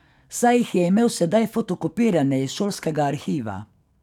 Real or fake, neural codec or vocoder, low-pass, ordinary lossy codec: fake; codec, 44.1 kHz, 7.8 kbps, DAC; 19.8 kHz; none